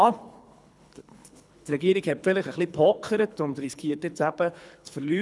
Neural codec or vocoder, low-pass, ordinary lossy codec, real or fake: codec, 24 kHz, 3 kbps, HILCodec; none; none; fake